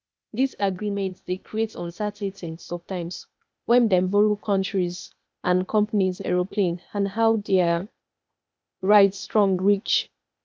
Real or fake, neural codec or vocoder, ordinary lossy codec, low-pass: fake; codec, 16 kHz, 0.8 kbps, ZipCodec; none; none